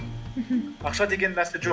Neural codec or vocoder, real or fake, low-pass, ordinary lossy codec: none; real; none; none